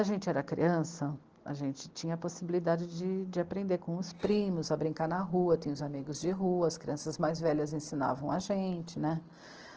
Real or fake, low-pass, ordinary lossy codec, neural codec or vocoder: real; 7.2 kHz; Opus, 16 kbps; none